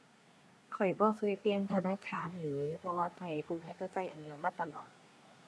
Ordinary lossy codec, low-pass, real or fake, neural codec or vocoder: none; none; fake; codec, 24 kHz, 1 kbps, SNAC